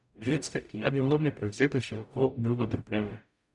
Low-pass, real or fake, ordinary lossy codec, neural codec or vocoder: 10.8 kHz; fake; none; codec, 44.1 kHz, 0.9 kbps, DAC